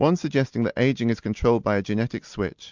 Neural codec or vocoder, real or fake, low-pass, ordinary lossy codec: none; real; 7.2 kHz; MP3, 64 kbps